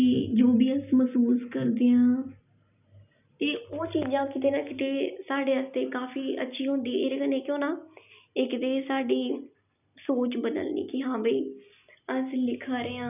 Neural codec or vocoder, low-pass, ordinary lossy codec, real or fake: none; 3.6 kHz; none; real